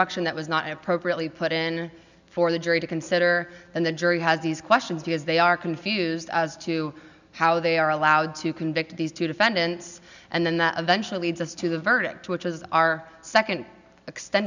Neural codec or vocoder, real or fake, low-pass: none; real; 7.2 kHz